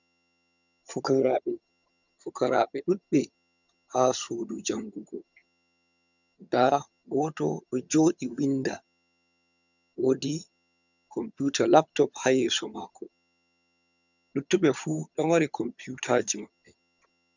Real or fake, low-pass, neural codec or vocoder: fake; 7.2 kHz; vocoder, 22.05 kHz, 80 mel bands, HiFi-GAN